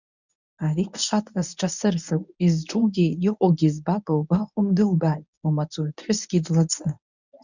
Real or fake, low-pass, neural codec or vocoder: fake; 7.2 kHz; codec, 24 kHz, 0.9 kbps, WavTokenizer, medium speech release version 1